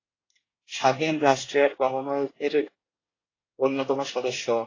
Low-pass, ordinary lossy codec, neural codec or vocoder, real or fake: 7.2 kHz; AAC, 32 kbps; codec, 24 kHz, 1 kbps, SNAC; fake